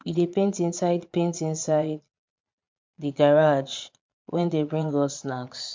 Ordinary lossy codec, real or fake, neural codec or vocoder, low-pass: MP3, 64 kbps; fake; vocoder, 22.05 kHz, 80 mel bands, Vocos; 7.2 kHz